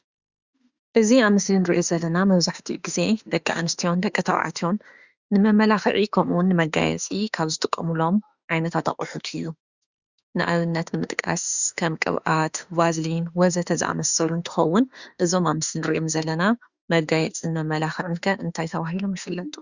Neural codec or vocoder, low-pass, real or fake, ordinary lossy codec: autoencoder, 48 kHz, 32 numbers a frame, DAC-VAE, trained on Japanese speech; 7.2 kHz; fake; Opus, 64 kbps